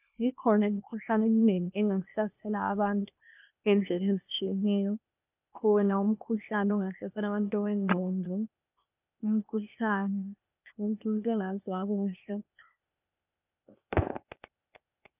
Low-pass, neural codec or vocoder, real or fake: 3.6 kHz; codec, 16 kHz, 0.8 kbps, ZipCodec; fake